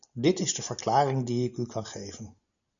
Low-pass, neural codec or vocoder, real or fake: 7.2 kHz; none; real